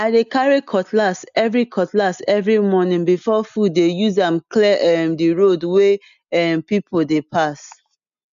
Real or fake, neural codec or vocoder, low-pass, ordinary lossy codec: real; none; 7.2 kHz; none